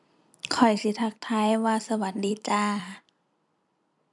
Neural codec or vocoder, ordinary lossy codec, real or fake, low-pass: vocoder, 44.1 kHz, 128 mel bands every 256 samples, BigVGAN v2; none; fake; 10.8 kHz